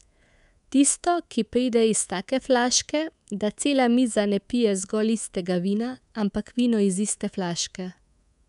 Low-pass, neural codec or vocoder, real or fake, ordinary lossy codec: 10.8 kHz; codec, 24 kHz, 3.1 kbps, DualCodec; fake; none